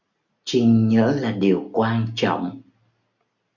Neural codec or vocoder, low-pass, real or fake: none; 7.2 kHz; real